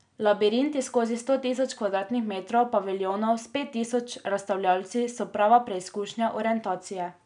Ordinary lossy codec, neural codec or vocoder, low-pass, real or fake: none; none; 9.9 kHz; real